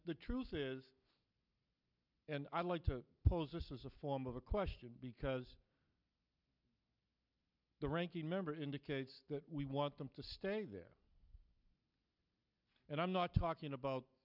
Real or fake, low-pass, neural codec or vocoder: real; 5.4 kHz; none